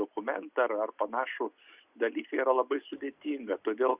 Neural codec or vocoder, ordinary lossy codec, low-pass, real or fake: none; Opus, 64 kbps; 3.6 kHz; real